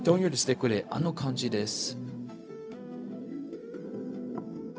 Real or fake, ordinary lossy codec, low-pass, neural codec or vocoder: fake; none; none; codec, 16 kHz, 0.4 kbps, LongCat-Audio-Codec